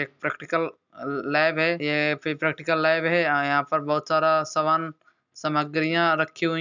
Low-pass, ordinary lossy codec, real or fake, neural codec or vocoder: 7.2 kHz; none; real; none